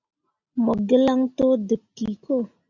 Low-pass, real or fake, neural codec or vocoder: 7.2 kHz; real; none